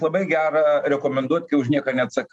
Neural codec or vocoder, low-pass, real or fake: none; 10.8 kHz; real